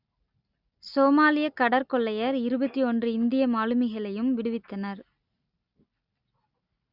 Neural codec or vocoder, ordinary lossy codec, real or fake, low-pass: none; Opus, 64 kbps; real; 5.4 kHz